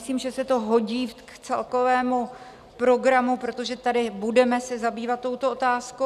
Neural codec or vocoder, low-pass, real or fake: none; 14.4 kHz; real